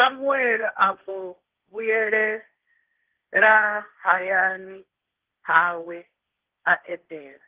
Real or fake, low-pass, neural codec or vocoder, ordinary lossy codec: fake; 3.6 kHz; codec, 16 kHz, 1.1 kbps, Voila-Tokenizer; Opus, 16 kbps